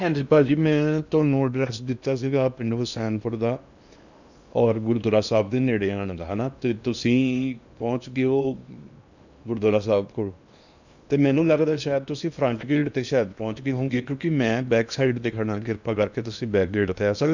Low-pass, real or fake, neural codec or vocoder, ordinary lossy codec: 7.2 kHz; fake; codec, 16 kHz in and 24 kHz out, 0.8 kbps, FocalCodec, streaming, 65536 codes; none